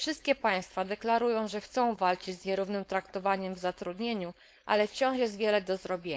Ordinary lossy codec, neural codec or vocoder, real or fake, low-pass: none; codec, 16 kHz, 4.8 kbps, FACodec; fake; none